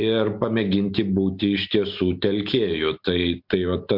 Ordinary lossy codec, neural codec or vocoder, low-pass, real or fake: MP3, 48 kbps; none; 5.4 kHz; real